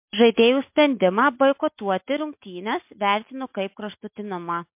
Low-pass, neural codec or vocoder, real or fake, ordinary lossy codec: 3.6 kHz; none; real; MP3, 32 kbps